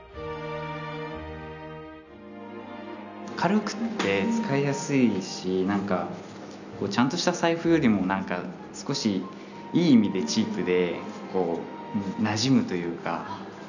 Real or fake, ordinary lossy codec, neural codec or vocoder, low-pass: real; none; none; 7.2 kHz